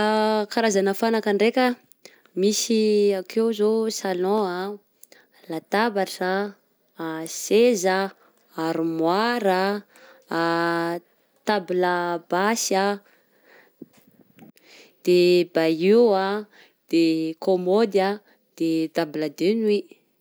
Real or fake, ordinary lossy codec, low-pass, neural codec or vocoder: real; none; none; none